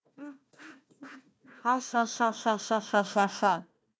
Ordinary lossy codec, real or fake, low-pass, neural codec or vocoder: none; fake; none; codec, 16 kHz, 1 kbps, FunCodec, trained on Chinese and English, 50 frames a second